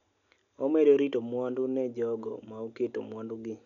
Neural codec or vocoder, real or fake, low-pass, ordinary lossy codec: none; real; 7.2 kHz; none